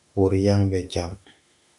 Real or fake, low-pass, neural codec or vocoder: fake; 10.8 kHz; autoencoder, 48 kHz, 32 numbers a frame, DAC-VAE, trained on Japanese speech